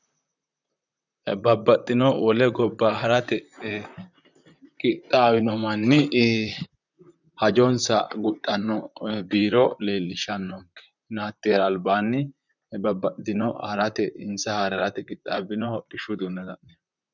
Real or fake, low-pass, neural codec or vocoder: fake; 7.2 kHz; vocoder, 44.1 kHz, 128 mel bands, Pupu-Vocoder